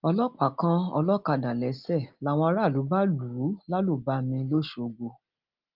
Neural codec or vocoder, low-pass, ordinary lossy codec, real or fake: none; 5.4 kHz; Opus, 24 kbps; real